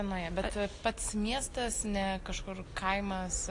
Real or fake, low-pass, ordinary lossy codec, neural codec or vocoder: real; 10.8 kHz; AAC, 48 kbps; none